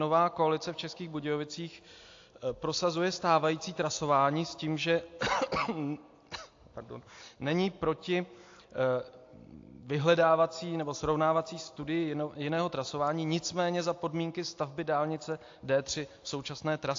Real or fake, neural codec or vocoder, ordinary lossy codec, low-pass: real; none; AAC, 48 kbps; 7.2 kHz